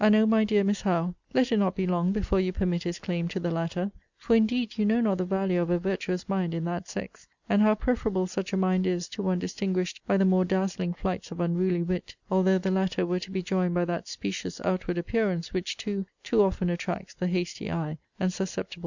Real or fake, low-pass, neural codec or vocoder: real; 7.2 kHz; none